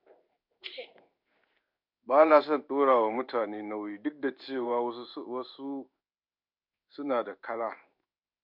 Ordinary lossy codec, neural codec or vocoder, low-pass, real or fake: MP3, 48 kbps; codec, 16 kHz in and 24 kHz out, 1 kbps, XY-Tokenizer; 5.4 kHz; fake